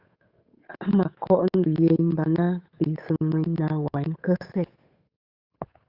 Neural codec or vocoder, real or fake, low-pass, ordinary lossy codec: codec, 16 kHz, 8 kbps, FunCodec, trained on Chinese and English, 25 frames a second; fake; 5.4 kHz; Opus, 64 kbps